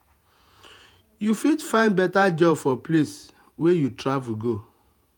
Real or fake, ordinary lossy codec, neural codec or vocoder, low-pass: fake; none; vocoder, 48 kHz, 128 mel bands, Vocos; none